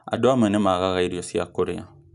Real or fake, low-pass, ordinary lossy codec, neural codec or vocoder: real; 10.8 kHz; none; none